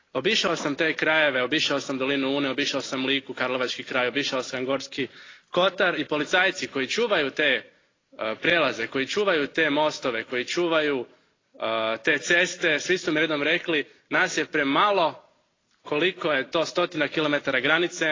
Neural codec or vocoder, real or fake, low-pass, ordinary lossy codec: none; real; 7.2 kHz; AAC, 32 kbps